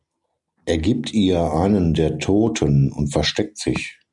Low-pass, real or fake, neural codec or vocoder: 10.8 kHz; real; none